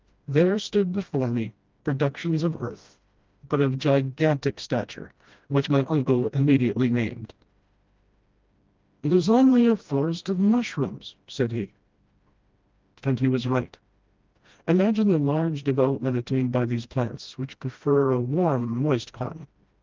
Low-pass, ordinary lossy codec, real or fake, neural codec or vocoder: 7.2 kHz; Opus, 24 kbps; fake; codec, 16 kHz, 1 kbps, FreqCodec, smaller model